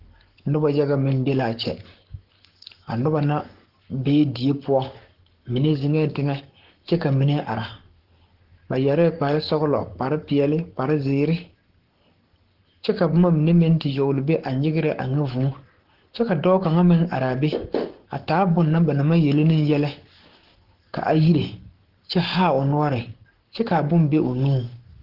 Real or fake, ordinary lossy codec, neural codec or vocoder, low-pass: fake; Opus, 16 kbps; codec, 44.1 kHz, 7.8 kbps, Pupu-Codec; 5.4 kHz